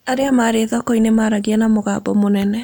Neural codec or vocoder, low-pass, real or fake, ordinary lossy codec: none; none; real; none